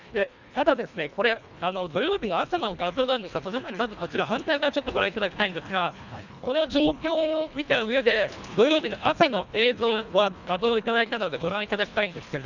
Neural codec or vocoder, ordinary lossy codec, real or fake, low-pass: codec, 24 kHz, 1.5 kbps, HILCodec; none; fake; 7.2 kHz